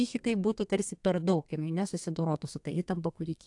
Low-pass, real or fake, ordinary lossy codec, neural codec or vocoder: 10.8 kHz; fake; AAC, 64 kbps; codec, 32 kHz, 1.9 kbps, SNAC